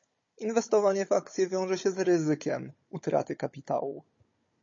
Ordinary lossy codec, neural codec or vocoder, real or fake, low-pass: MP3, 32 kbps; codec, 16 kHz, 16 kbps, FunCodec, trained on Chinese and English, 50 frames a second; fake; 7.2 kHz